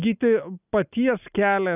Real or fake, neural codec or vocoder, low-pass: real; none; 3.6 kHz